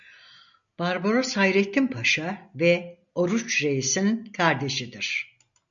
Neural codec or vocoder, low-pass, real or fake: none; 7.2 kHz; real